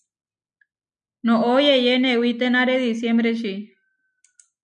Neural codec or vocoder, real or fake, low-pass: none; real; 9.9 kHz